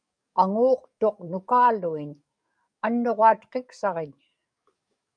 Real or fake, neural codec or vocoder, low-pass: fake; codec, 44.1 kHz, 7.8 kbps, DAC; 9.9 kHz